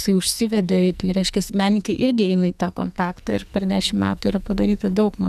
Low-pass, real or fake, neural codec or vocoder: 14.4 kHz; fake; codec, 44.1 kHz, 2.6 kbps, SNAC